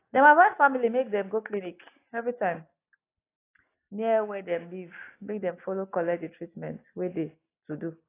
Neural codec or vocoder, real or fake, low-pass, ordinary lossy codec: none; real; 3.6 kHz; AAC, 24 kbps